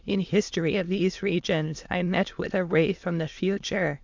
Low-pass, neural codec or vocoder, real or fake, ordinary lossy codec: 7.2 kHz; autoencoder, 22.05 kHz, a latent of 192 numbers a frame, VITS, trained on many speakers; fake; MP3, 64 kbps